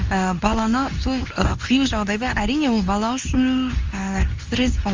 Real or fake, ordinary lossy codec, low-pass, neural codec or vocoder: fake; Opus, 32 kbps; 7.2 kHz; codec, 24 kHz, 0.9 kbps, WavTokenizer, medium speech release version 2